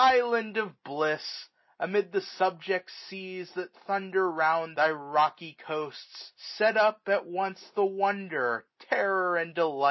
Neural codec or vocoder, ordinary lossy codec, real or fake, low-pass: none; MP3, 24 kbps; real; 7.2 kHz